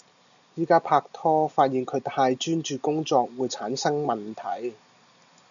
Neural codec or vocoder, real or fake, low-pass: none; real; 7.2 kHz